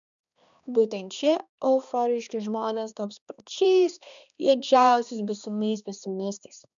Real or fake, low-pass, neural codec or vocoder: fake; 7.2 kHz; codec, 16 kHz, 2 kbps, X-Codec, HuBERT features, trained on balanced general audio